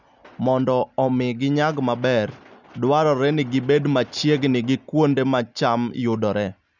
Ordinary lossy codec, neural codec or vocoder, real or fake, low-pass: none; none; real; 7.2 kHz